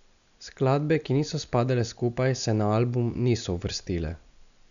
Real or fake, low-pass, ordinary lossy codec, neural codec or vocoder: real; 7.2 kHz; none; none